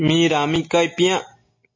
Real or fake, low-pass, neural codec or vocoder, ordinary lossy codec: real; 7.2 kHz; none; MP3, 32 kbps